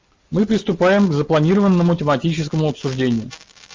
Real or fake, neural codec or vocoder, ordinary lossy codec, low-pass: real; none; Opus, 32 kbps; 7.2 kHz